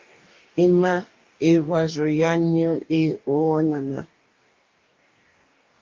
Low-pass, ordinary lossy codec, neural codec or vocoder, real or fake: 7.2 kHz; Opus, 24 kbps; codec, 44.1 kHz, 2.6 kbps, DAC; fake